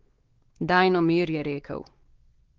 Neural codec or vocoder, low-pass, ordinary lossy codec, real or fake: codec, 16 kHz, 4 kbps, X-Codec, WavLM features, trained on Multilingual LibriSpeech; 7.2 kHz; Opus, 16 kbps; fake